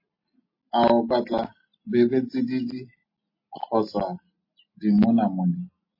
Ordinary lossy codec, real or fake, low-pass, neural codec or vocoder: MP3, 24 kbps; real; 5.4 kHz; none